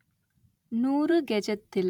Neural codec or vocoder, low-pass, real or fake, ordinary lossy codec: vocoder, 44.1 kHz, 128 mel bands every 512 samples, BigVGAN v2; 19.8 kHz; fake; none